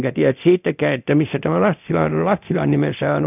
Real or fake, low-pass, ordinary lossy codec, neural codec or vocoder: fake; 3.6 kHz; none; codec, 24 kHz, 0.9 kbps, DualCodec